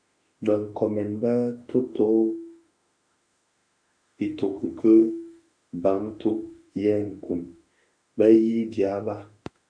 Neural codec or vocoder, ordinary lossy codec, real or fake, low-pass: autoencoder, 48 kHz, 32 numbers a frame, DAC-VAE, trained on Japanese speech; MP3, 96 kbps; fake; 9.9 kHz